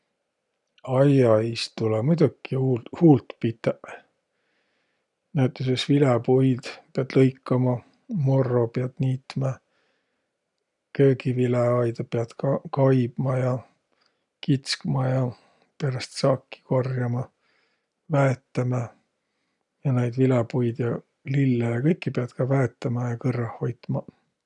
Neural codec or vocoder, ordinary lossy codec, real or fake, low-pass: none; none; real; 10.8 kHz